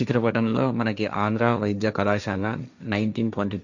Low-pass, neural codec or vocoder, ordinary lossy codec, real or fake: 7.2 kHz; codec, 16 kHz, 1.1 kbps, Voila-Tokenizer; none; fake